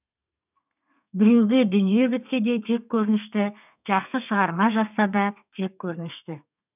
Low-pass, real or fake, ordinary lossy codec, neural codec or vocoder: 3.6 kHz; fake; none; codec, 32 kHz, 1.9 kbps, SNAC